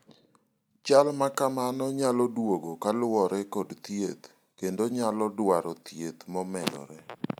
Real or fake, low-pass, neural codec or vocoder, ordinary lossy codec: real; none; none; none